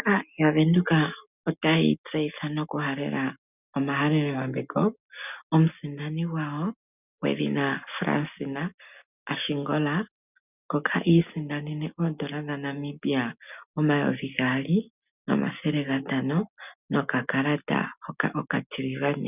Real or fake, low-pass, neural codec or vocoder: real; 3.6 kHz; none